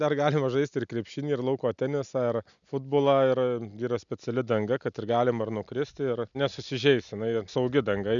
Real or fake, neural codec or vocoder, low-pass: real; none; 7.2 kHz